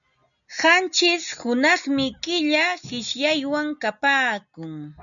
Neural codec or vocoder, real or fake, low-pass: none; real; 7.2 kHz